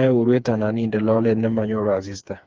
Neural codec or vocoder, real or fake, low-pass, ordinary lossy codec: codec, 16 kHz, 4 kbps, FreqCodec, smaller model; fake; 7.2 kHz; Opus, 24 kbps